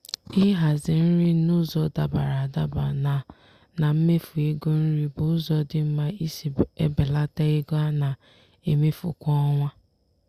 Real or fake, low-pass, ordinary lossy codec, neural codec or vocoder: real; 14.4 kHz; Opus, 64 kbps; none